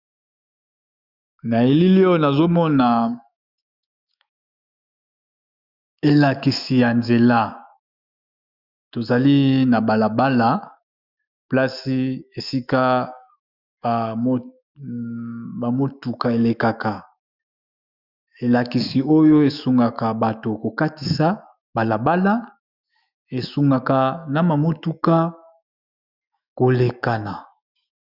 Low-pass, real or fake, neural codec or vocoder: 5.4 kHz; fake; autoencoder, 48 kHz, 128 numbers a frame, DAC-VAE, trained on Japanese speech